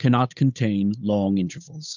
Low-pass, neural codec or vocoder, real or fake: 7.2 kHz; codec, 16 kHz, 8 kbps, FunCodec, trained on Chinese and English, 25 frames a second; fake